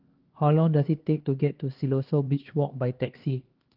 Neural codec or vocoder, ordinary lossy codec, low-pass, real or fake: codec, 16 kHz, 2 kbps, X-Codec, HuBERT features, trained on LibriSpeech; Opus, 16 kbps; 5.4 kHz; fake